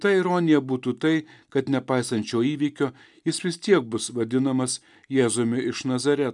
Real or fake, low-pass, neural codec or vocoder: real; 10.8 kHz; none